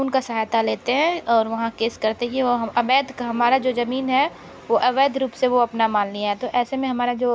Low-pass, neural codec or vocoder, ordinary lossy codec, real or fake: none; none; none; real